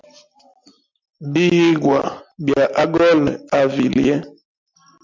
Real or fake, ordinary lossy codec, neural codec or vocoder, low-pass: real; MP3, 64 kbps; none; 7.2 kHz